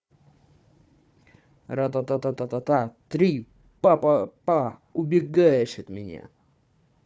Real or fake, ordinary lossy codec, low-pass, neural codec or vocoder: fake; none; none; codec, 16 kHz, 4 kbps, FunCodec, trained on Chinese and English, 50 frames a second